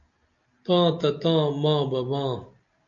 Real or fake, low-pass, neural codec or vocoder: real; 7.2 kHz; none